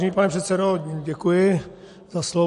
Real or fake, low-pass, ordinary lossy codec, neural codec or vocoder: real; 14.4 kHz; MP3, 48 kbps; none